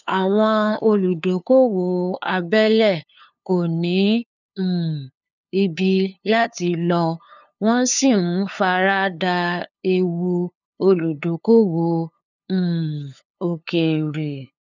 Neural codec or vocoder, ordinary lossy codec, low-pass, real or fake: codec, 16 kHz, 2 kbps, FunCodec, trained on LibriTTS, 25 frames a second; none; 7.2 kHz; fake